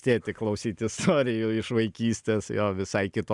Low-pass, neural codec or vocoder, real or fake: 10.8 kHz; none; real